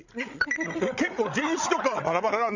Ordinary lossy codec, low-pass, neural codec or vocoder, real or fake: none; 7.2 kHz; codec, 16 kHz, 16 kbps, FreqCodec, larger model; fake